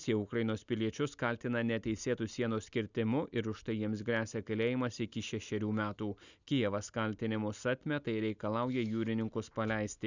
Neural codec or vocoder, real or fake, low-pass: none; real; 7.2 kHz